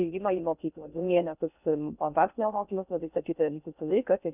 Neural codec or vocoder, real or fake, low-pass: codec, 16 kHz in and 24 kHz out, 0.6 kbps, FocalCodec, streaming, 2048 codes; fake; 3.6 kHz